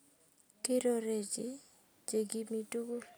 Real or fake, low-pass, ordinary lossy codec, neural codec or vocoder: real; none; none; none